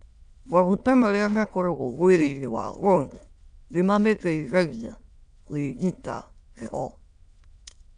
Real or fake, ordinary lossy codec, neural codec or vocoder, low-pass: fake; none; autoencoder, 22.05 kHz, a latent of 192 numbers a frame, VITS, trained on many speakers; 9.9 kHz